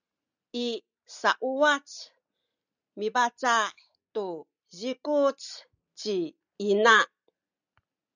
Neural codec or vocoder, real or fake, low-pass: none; real; 7.2 kHz